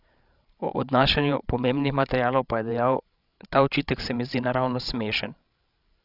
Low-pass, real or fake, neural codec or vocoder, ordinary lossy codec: 5.4 kHz; fake; codec, 16 kHz, 16 kbps, FreqCodec, larger model; none